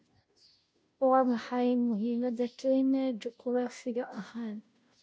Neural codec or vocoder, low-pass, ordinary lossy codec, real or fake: codec, 16 kHz, 0.5 kbps, FunCodec, trained on Chinese and English, 25 frames a second; none; none; fake